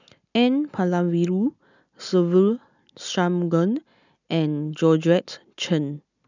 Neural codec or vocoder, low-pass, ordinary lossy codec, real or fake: none; 7.2 kHz; none; real